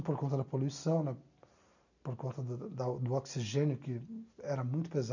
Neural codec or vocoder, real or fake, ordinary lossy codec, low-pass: none; real; none; 7.2 kHz